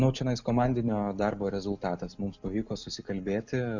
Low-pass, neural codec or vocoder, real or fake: 7.2 kHz; none; real